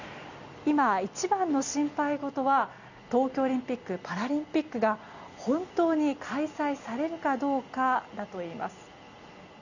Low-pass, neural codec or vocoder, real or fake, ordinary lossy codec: 7.2 kHz; none; real; none